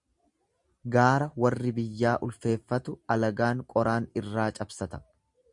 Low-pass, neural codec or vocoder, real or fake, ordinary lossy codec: 10.8 kHz; none; real; Opus, 64 kbps